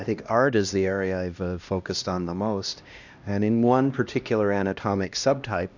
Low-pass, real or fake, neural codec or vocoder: 7.2 kHz; fake; codec, 16 kHz, 1 kbps, X-Codec, HuBERT features, trained on LibriSpeech